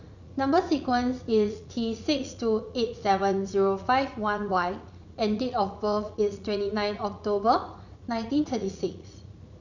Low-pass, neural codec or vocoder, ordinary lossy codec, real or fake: 7.2 kHz; vocoder, 44.1 kHz, 80 mel bands, Vocos; none; fake